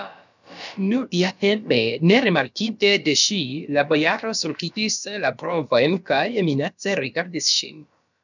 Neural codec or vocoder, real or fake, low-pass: codec, 16 kHz, about 1 kbps, DyCAST, with the encoder's durations; fake; 7.2 kHz